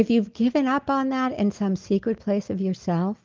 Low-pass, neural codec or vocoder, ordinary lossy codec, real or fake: 7.2 kHz; none; Opus, 32 kbps; real